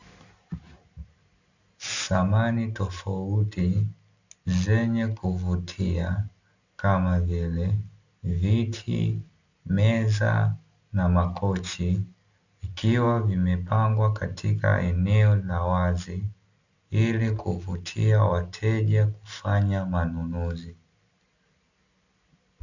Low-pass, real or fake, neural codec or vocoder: 7.2 kHz; real; none